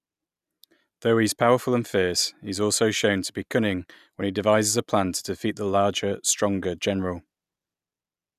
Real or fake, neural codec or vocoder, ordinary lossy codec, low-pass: real; none; none; 14.4 kHz